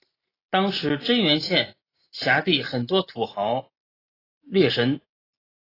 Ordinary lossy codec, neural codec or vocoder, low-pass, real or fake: AAC, 24 kbps; none; 5.4 kHz; real